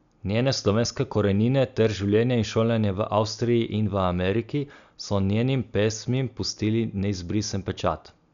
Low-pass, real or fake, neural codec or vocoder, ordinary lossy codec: 7.2 kHz; real; none; MP3, 96 kbps